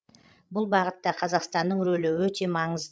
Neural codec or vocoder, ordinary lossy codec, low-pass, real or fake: codec, 16 kHz, 16 kbps, FreqCodec, larger model; none; none; fake